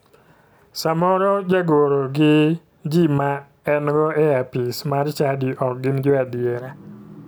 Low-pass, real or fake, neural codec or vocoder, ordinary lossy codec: none; real; none; none